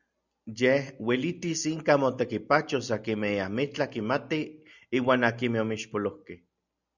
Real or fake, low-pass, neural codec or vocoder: real; 7.2 kHz; none